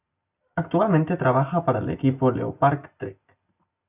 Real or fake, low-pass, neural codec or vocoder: real; 3.6 kHz; none